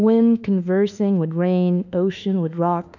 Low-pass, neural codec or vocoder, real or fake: 7.2 kHz; autoencoder, 48 kHz, 32 numbers a frame, DAC-VAE, trained on Japanese speech; fake